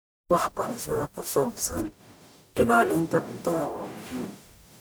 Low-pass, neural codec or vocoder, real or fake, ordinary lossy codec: none; codec, 44.1 kHz, 0.9 kbps, DAC; fake; none